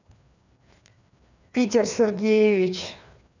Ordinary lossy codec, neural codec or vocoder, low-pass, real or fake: none; codec, 16 kHz, 2 kbps, FreqCodec, larger model; 7.2 kHz; fake